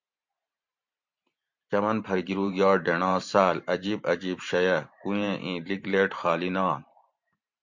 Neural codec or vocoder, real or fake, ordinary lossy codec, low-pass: vocoder, 44.1 kHz, 128 mel bands every 512 samples, BigVGAN v2; fake; MP3, 48 kbps; 7.2 kHz